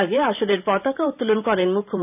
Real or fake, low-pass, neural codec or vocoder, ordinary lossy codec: fake; 3.6 kHz; vocoder, 44.1 kHz, 128 mel bands every 512 samples, BigVGAN v2; none